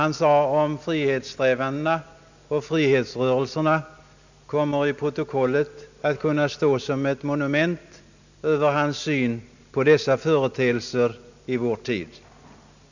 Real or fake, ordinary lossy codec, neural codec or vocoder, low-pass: real; none; none; 7.2 kHz